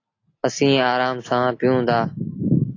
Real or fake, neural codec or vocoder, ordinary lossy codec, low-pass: real; none; AAC, 32 kbps; 7.2 kHz